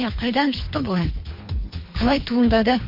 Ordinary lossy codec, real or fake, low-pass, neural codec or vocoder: MP3, 32 kbps; fake; 5.4 kHz; codec, 24 kHz, 3 kbps, HILCodec